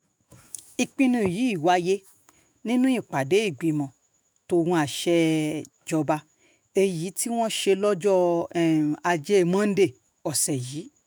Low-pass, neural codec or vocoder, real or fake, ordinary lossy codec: none; autoencoder, 48 kHz, 128 numbers a frame, DAC-VAE, trained on Japanese speech; fake; none